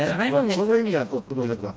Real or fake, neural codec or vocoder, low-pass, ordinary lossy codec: fake; codec, 16 kHz, 1 kbps, FreqCodec, smaller model; none; none